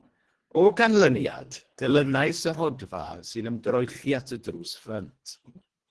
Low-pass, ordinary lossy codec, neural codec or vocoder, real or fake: 10.8 kHz; Opus, 16 kbps; codec, 24 kHz, 1.5 kbps, HILCodec; fake